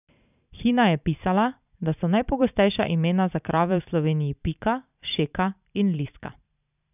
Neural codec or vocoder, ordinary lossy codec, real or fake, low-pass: vocoder, 44.1 kHz, 128 mel bands every 512 samples, BigVGAN v2; none; fake; 3.6 kHz